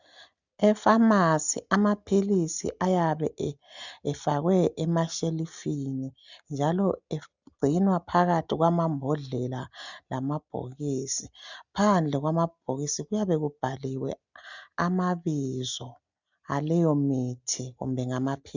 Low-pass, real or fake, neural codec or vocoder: 7.2 kHz; real; none